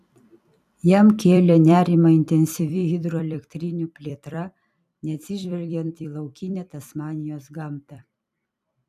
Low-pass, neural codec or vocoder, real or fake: 14.4 kHz; vocoder, 44.1 kHz, 128 mel bands every 256 samples, BigVGAN v2; fake